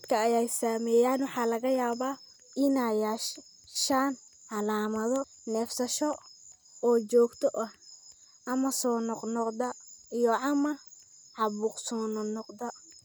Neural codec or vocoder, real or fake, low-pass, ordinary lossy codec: none; real; none; none